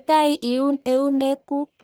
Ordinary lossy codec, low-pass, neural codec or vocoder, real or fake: none; none; codec, 44.1 kHz, 1.7 kbps, Pupu-Codec; fake